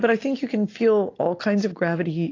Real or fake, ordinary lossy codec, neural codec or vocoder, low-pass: real; AAC, 32 kbps; none; 7.2 kHz